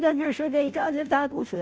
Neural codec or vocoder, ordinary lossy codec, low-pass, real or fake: codec, 16 kHz, 0.5 kbps, FunCodec, trained on Chinese and English, 25 frames a second; none; none; fake